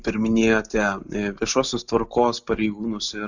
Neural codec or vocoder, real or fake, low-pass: none; real; 7.2 kHz